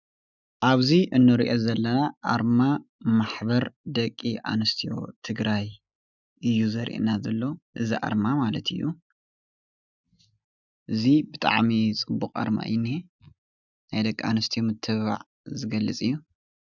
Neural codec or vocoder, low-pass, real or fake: none; 7.2 kHz; real